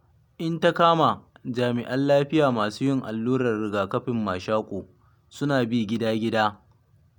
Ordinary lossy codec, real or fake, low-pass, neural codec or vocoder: none; real; 19.8 kHz; none